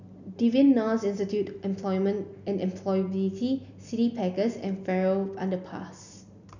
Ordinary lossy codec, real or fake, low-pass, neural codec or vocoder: none; real; 7.2 kHz; none